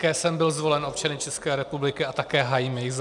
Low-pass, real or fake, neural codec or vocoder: 10.8 kHz; real; none